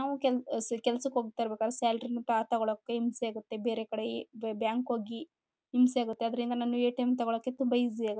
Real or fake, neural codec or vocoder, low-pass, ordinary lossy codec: real; none; none; none